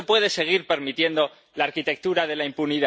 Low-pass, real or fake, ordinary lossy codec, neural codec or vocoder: none; real; none; none